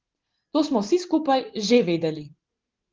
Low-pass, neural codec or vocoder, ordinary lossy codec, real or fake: 7.2 kHz; none; Opus, 16 kbps; real